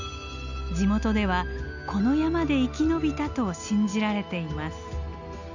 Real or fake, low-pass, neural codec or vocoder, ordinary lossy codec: real; 7.2 kHz; none; none